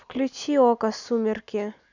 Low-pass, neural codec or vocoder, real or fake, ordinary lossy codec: 7.2 kHz; none; real; none